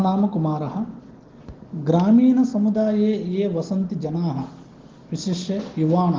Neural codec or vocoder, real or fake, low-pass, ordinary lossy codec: none; real; 7.2 kHz; Opus, 16 kbps